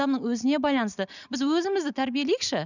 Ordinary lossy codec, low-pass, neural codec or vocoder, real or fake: none; 7.2 kHz; none; real